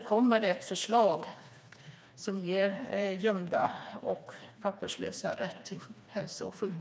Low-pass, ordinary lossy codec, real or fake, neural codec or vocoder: none; none; fake; codec, 16 kHz, 2 kbps, FreqCodec, smaller model